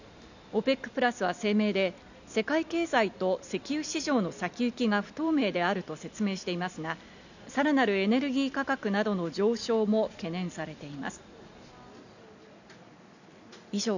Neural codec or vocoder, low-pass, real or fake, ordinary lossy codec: none; 7.2 kHz; real; none